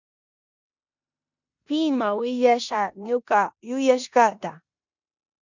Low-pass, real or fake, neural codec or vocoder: 7.2 kHz; fake; codec, 16 kHz in and 24 kHz out, 0.9 kbps, LongCat-Audio-Codec, four codebook decoder